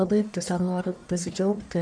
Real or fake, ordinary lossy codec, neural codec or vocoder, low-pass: fake; MP3, 64 kbps; codec, 44.1 kHz, 1.7 kbps, Pupu-Codec; 9.9 kHz